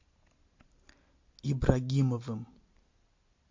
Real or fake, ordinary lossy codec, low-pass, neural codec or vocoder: real; MP3, 48 kbps; 7.2 kHz; none